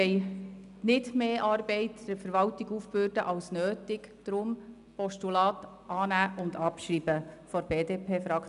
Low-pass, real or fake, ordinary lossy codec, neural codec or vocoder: 10.8 kHz; real; none; none